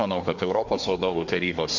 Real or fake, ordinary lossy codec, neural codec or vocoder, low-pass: fake; MP3, 48 kbps; codec, 24 kHz, 1 kbps, SNAC; 7.2 kHz